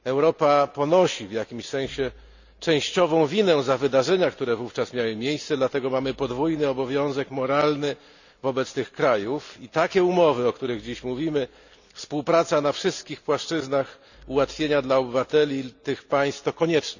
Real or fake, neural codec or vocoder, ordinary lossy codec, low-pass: real; none; none; 7.2 kHz